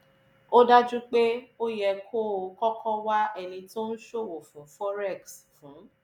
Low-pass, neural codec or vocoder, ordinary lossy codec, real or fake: 19.8 kHz; none; none; real